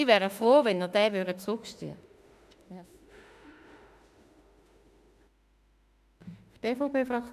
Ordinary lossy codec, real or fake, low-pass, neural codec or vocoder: AAC, 96 kbps; fake; 14.4 kHz; autoencoder, 48 kHz, 32 numbers a frame, DAC-VAE, trained on Japanese speech